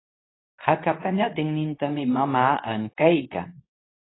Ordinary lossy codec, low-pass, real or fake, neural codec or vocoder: AAC, 16 kbps; 7.2 kHz; fake; codec, 24 kHz, 0.9 kbps, WavTokenizer, medium speech release version 2